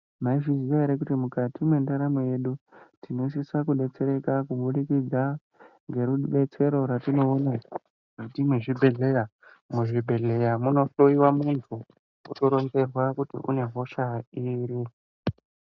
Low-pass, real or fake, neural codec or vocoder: 7.2 kHz; real; none